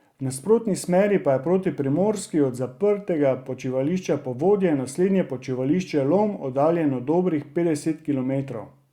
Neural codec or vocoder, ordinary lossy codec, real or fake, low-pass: none; Opus, 64 kbps; real; 19.8 kHz